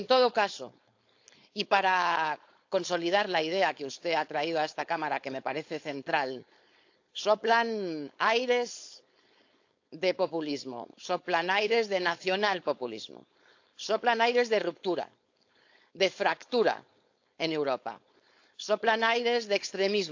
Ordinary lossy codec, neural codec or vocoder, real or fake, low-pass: AAC, 48 kbps; codec, 16 kHz, 4.8 kbps, FACodec; fake; 7.2 kHz